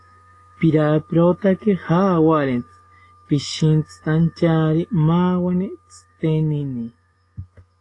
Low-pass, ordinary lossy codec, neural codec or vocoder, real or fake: 10.8 kHz; AAC, 32 kbps; autoencoder, 48 kHz, 128 numbers a frame, DAC-VAE, trained on Japanese speech; fake